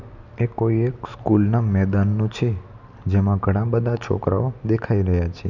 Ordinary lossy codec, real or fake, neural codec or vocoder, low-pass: none; real; none; 7.2 kHz